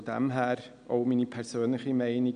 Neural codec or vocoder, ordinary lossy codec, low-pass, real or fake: none; none; 9.9 kHz; real